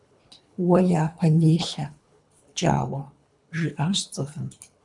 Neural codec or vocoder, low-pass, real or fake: codec, 24 kHz, 3 kbps, HILCodec; 10.8 kHz; fake